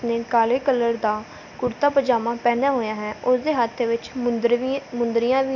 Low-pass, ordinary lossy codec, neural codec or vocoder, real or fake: 7.2 kHz; none; none; real